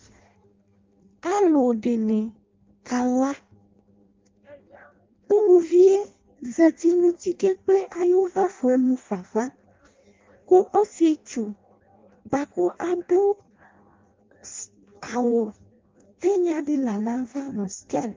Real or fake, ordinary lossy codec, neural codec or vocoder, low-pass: fake; Opus, 32 kbps; codec, 16 kHz in and 24 kHz out, 0.6 kbps, FireRedTTS-2 codec; 7.2 kHz